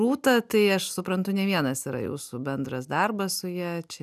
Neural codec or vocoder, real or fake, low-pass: none; real; 14.4 kHz